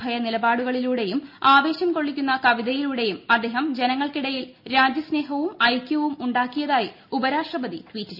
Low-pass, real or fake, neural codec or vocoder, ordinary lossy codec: 5.4 kHz; real; none; none